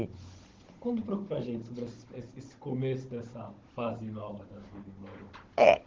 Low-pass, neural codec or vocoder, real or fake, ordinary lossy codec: 7.2 kHz; codec, 16 kHz, 16 kbps, FunCodec, trained on Chinese and English, 50 frames a second; fake; Opus, 16 kbps